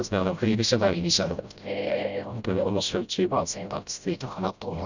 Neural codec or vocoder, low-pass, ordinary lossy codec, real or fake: codec, 16 kHz, 0.5 kbps, FreqCodec, smaller model; 7.2 kHz; none; fake